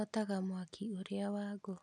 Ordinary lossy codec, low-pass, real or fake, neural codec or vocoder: none; none; real; none